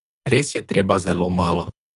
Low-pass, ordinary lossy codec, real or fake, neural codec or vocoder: 10.8 kHz; none; fake; codec, 24 kHz, 3 kbps, HILCodec